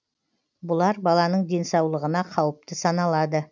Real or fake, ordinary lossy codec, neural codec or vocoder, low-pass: real; none; none; 7.2 kHz